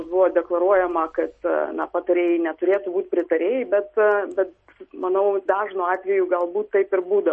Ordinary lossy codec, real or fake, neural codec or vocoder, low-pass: MP3, 32 kbps; real; none; 10.8 kHz